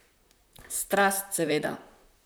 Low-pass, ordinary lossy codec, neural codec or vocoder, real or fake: none; none; vocoder, 44.1 kHz, 128 mel bands, Pupu-Vocoder; fake